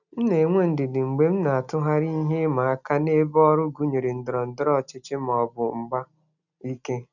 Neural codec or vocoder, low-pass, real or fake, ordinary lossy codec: none; 7.2 kHz; real; none